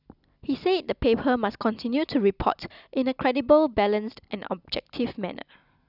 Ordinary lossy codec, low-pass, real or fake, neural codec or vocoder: none; 5.4 kHz; real; none